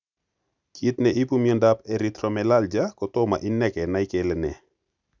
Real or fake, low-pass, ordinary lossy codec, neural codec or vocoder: real; 7.2 kHz; none; none